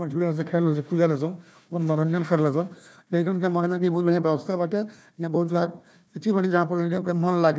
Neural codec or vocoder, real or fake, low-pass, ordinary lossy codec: codec, 16 kHz, 1 kbps, FunCodec, trained on Chinese and English, 50 frames a second; fake; none; none